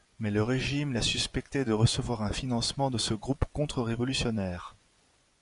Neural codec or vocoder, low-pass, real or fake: vocoder, 24 kHz, 100 mel bands, Vocos; 10.8 kHz; fake